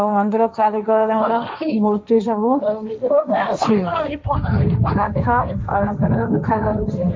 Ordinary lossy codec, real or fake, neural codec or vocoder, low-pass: none; fake; codec, 16 kHz, 1.1 kbps, Voila-Tokenizer; none